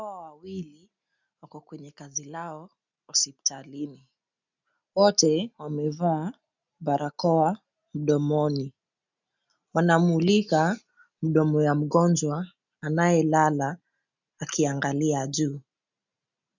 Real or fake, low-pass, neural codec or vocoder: real; 7.2 kHz; none